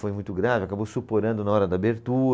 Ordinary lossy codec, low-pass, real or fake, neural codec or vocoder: none; none; real; none